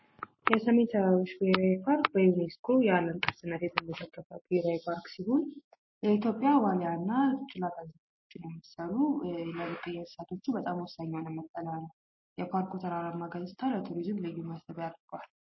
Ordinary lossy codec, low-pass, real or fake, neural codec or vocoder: MP3, 24 kbps; 7.2 kHz; real; none